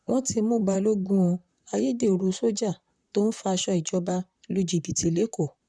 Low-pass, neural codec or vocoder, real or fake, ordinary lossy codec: 9.9 kHz; vocoder, 44.1 kHz, 128 mel bands, Pupu-Vocoder; fake; none